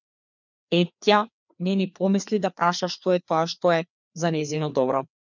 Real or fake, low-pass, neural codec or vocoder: fake; 7.2 kHz; codec, 16 kHz, 2 kbps, FreqCodec, larger model